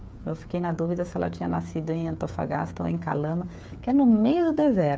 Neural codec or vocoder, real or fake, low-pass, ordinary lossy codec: codec, 16 kHz, 8 kbps, FreqCodec, smaller model; fake; none; none